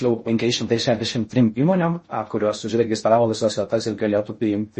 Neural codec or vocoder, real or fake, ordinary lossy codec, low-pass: codec, 16 kHz in and 24 kHz out, 0.6 kbps, FocalCodec, streaming, 4096 codes; fake; MP3, 32 kbps; 10.8 kHz